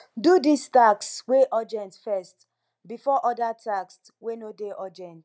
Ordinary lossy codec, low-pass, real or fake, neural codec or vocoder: none; none; real; none